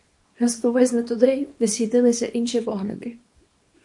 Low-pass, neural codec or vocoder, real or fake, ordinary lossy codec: 10.8 kHz; codec, 24 kHz, 0.9 kbps, WavTokenizer, small release; fake; MP3, 48 kbps